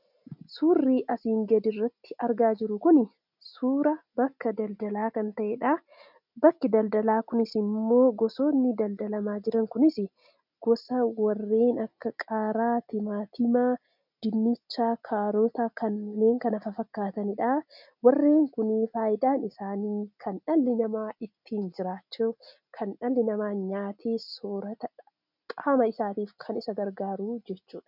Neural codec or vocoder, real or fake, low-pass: none; real; 5.4 kHz